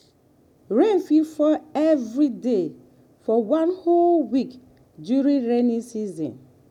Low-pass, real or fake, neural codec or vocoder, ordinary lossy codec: 19.8 kHz; real; none; none